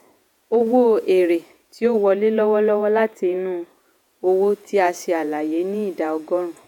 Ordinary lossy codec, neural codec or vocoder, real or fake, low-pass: none; vocoder, 48 kHz, 128 mel bands, Vocos; fake; 19.8 kHz